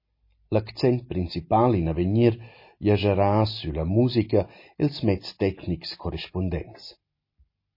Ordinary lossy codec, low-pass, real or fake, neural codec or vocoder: MP3, 24 kbps; 5.4 kHz; real; none